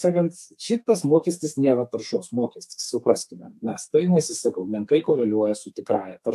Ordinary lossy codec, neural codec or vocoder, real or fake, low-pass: AAC, 96 kbps; codec, 32 kHz, 1.9 kbps, SNAC; fake; 14.4 kHz